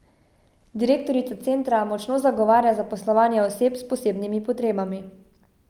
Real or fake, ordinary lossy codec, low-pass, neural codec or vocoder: real; Opus, 32 kbps; 19.8 kHz; none